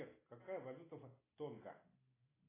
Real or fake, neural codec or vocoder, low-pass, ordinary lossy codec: real; none; 3.6 kHz; AAC, 16 kbps